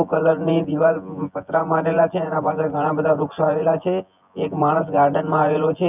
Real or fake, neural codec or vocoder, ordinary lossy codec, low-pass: fake; vocoder, 24 kHz, 100 mel bands, Vocos; none; 3.6 kHz